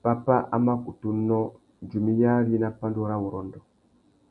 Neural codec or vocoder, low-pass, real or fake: none; 10.8 kHz; real